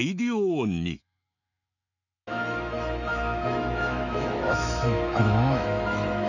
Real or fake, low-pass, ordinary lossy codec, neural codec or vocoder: fake; 7.2 kHz; none; autoencoder, 48 kHz, 128 numbers a frame, DAC-VAE, trained on Japanese speech